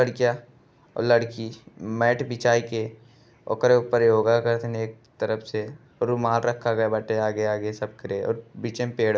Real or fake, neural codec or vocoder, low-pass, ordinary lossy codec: real; none; none; none